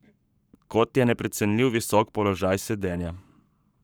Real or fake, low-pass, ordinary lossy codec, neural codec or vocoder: fake; none; none; codec, 44.1 kHz, 7.8 kbps, Pupu-Codec